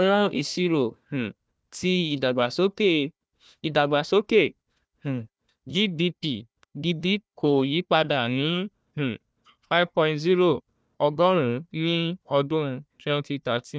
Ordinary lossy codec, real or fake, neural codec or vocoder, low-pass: none; fake; codec, 16 kHz, 1 kbps, FunCodec, trained on Chinese and English, 50 frames a second; none